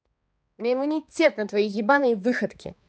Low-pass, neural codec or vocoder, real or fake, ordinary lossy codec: none; codec, 16 kHz, 2 kbps, X-Codec, HuBERT features, trained on balanced general audio; fake; none